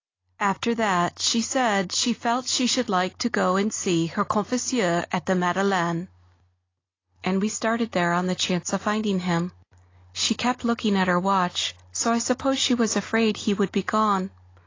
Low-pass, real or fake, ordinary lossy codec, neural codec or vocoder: 7.2 kHz; real; AAC, 32 kbps; none